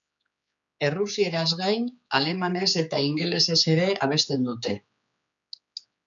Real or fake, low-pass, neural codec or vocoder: fake; 7.2 kHz; codec, 16 kHz, 4 kbps, X-Codec, HuBERT features, trained on general audio